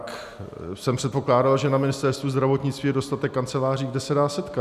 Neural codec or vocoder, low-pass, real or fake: none; 14.4 kHz; real